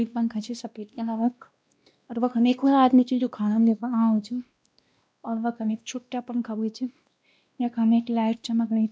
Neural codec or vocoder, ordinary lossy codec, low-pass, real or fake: codec, 16 kHz, 1 kbps, X-Codec, WavLM features, trained on Multilingual LibriSpeech; none; none; fake